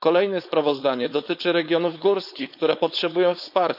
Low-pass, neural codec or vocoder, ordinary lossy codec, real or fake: 5.4 kHz; codec, 16 kHz, 4.8 kbps, FACodec; none; fake